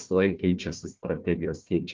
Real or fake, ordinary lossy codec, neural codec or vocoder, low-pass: fake; Opus, 32 kbps; codec, 16 kHz, 1 kbps, FunCodec, trained on Chinese and English, 50 frames a second; 7.2 kHz